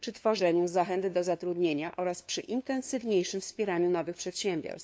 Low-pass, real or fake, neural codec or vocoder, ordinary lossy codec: none; fake; codec, 16 kHz, 2 kbps, FunCodec, trained on LibriTTS, 25 frames a second; none